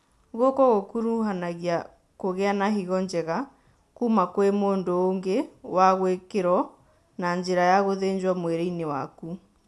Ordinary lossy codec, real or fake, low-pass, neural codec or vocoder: none; real; none; none